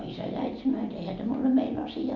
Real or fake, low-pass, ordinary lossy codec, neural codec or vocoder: real; 7.2 kHz; none; none